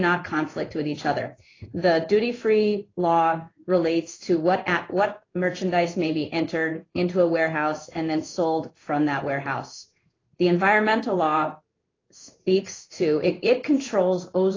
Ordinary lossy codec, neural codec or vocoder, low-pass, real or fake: AAC, 32 kbps; codec, 16 kHz in and 24 kHz out, 1 kbps, XY-Tokenizer; 7.2 kHz; fake